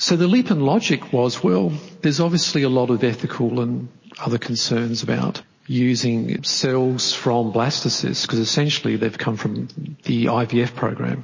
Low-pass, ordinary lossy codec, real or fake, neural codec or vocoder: 7.2 kHz; MP3, 32 kbps; real; none